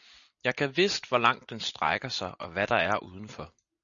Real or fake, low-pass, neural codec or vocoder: real; 7.2 kHz; none